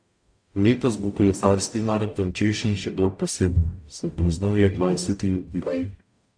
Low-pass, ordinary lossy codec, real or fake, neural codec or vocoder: 9.9 kHz; AAC, 64 kbps; fake; codec, 44.1 kHz, 0.9 kbps, DAC